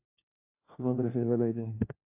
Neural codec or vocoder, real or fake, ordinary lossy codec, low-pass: codec, 16 kHz, 1 kbps, FunCodec, trained on LibriTTS, 50 frames a second; fake; AAC, 24 kbps; 3.6 kHz